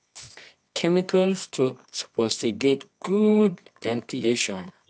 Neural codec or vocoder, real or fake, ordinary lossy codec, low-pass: codec, 24 kHz, 0.9 kbps, WavTokenizer, medium music audio release; fake; AAC, 64 kbps; 9.9 kHz